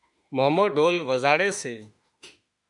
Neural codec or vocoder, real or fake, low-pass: autoencoder, 48 kHz, 32 numbers a frame, DAC-VAE, trained on Japanese speech; fake; 10.8 kHz